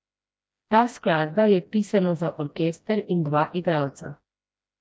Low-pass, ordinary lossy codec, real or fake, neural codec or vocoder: none; none; fake; codec, 16 kHz, 1 kbps, FreqCodec, smaller model